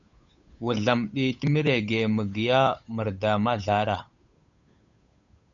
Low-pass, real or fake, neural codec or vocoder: 7.2 kHz; fake; codec, 16 kHz, 8 kbps, FunCodec, trained on Chinese and English, 25 frames a second